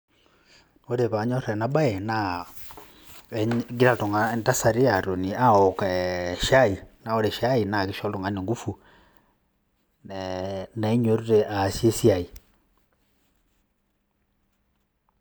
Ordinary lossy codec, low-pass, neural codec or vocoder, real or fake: none; none; none; real